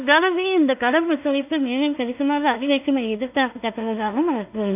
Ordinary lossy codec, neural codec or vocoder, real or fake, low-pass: AAC, 32 kbps; codec, 16 kHz in and 24 kHz out, 0.4 kbps, LongCat-Audio-Codec, two codebook decoder; fake; 3.6 kHz